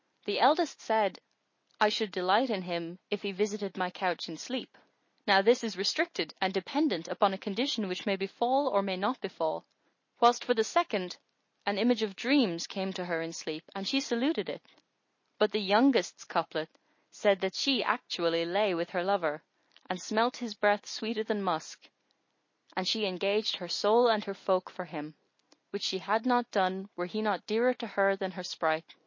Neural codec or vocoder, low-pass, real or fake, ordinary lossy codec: none; 7.2 kHz; real; MP3, 32 kbps